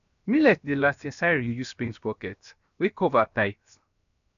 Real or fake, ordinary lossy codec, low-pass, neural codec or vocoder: fake; none; 7.2 kHz; codec, 16 kHz, 0.7 kbps, FocalCodec